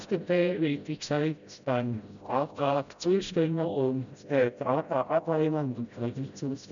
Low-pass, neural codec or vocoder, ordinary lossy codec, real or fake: 7.2 kHz; codec, 16 kHz, 0.5 kbps, FreqCodec, smaller model; none; fake